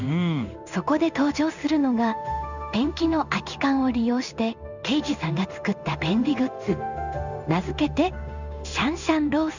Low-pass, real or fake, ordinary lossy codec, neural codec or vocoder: 7.2 kHz; fake; none; codec, 16 kHz in and 24 kHz out, 1 kbps, XY-Tokenizer